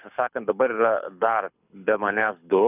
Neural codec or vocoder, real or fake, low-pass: codec, 24 kHz, 6 kbps, HILCodec; fake; 3.6 kHz